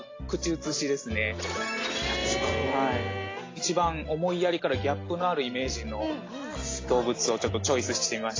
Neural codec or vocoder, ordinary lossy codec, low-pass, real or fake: none; AAC, 32 kbps; 7.2 kHz; real